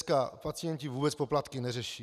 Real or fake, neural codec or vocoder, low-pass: real; none; 14.4 kHz